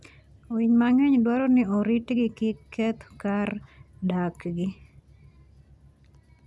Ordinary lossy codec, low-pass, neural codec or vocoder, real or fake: none; none; none; real